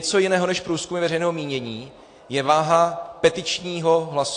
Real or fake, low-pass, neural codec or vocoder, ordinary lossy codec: real; 9.9 kHz; none; AAC, 48 kbps